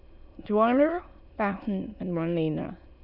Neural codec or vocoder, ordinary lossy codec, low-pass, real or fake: autoencoder, 22.05 kHz, a latent of 192 numbers a frame, VITS, trained on many speakers; none; 5.4 kHz; fake